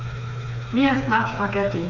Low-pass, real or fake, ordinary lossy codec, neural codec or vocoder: 7.2 kHz; fake; none; codec, 16 kHz, 4 kbps, FreqCodec, smaller model